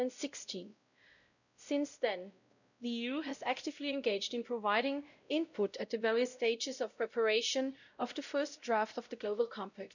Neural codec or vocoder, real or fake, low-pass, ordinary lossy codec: codec, 16 kHz, 0.5 kbps, X-Codec, WavLM features, trained on Multilingual LibriSpeech; fake; 7.2 kHz; none